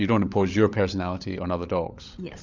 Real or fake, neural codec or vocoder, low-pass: fake; codec, 16 kHz, 16 kbps, FunCodec, trained on LibriTTS, 50 frames a second; 7.2 kHz